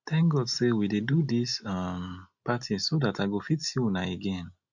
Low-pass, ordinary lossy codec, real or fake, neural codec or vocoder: 7.2 kHz; none; real; none